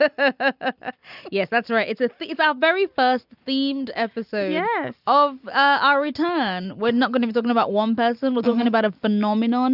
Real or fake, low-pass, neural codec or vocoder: real; 5.4 kHz; none